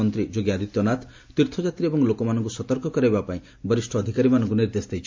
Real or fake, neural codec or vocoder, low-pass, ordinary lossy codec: real; none; 7.2 kHz; MP3, 48 kbps